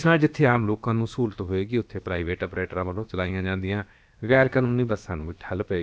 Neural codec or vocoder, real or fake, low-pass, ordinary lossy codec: codec, 16 kHz, about 1 kbps, DyCAST, with the encoder's durations; fake; none; none